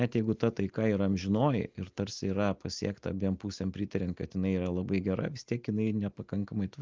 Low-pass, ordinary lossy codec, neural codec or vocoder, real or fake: 7.2 kHz; Opus, 32 kbps; none; real